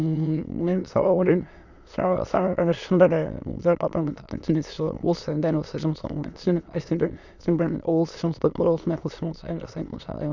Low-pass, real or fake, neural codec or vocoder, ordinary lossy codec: 7.2 kHz; fake; autoencoder, 22.05 kHz, a latent of 192 numbers a frame, VITS, trained on many speakers; none